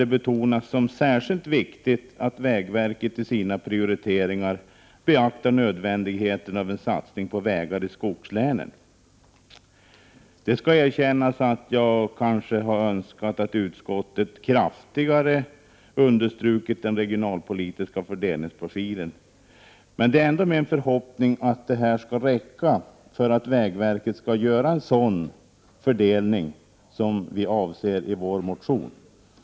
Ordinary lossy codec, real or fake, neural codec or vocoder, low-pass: none; real; none; none